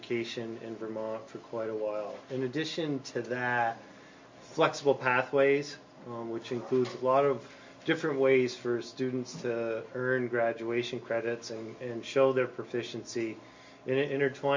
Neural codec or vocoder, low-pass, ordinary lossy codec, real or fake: none; 7.2 kHz; MP3, 48 kbps; real